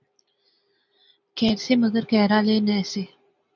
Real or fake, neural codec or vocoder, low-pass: real; none; 7.2 kHz